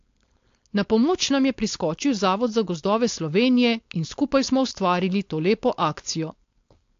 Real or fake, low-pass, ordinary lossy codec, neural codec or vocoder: fake; 7.2 kHz; AAC, 48 kbps; codec, 16 kHz, 4.8 kbps, FACodec